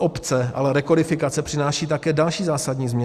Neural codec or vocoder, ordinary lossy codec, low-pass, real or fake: none; Opus, 64 kbps; 14.4 kHz; real